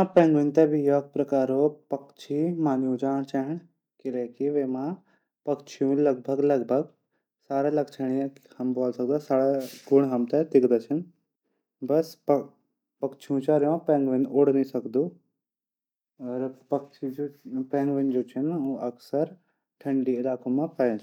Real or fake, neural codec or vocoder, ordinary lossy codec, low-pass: real; none; none; 19.8 kHz